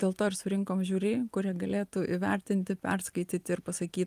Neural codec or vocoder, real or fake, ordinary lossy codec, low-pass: none; real; Opus, 32 kbps; 14.4 kHz